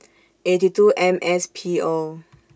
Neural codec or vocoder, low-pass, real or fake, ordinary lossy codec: none; none; real; none